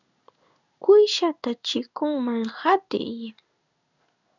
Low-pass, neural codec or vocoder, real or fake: 7.2 kHz; codec, 16 kHz in and 24 kHz out, 1 kbps, XY-Tokenizer; fake